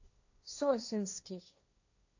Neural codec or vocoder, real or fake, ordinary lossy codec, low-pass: codec, 16 kHz, 1.1 kbps, Voila-Tokenizer; fake; AAC, 48 kbps; 7.2 kHz